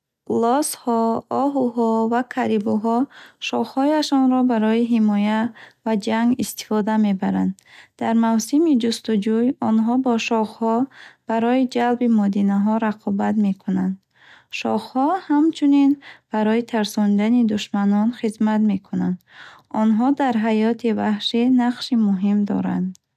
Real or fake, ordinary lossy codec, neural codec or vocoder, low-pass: real; none; none; 14.4 kHz